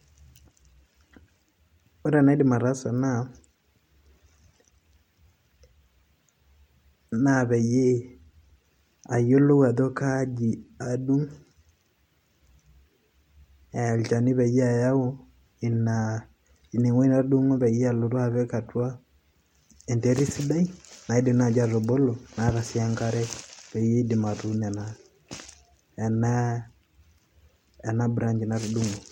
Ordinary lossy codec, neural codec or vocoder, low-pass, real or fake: MP3, 64 kbps; none; 19.8 kHz; real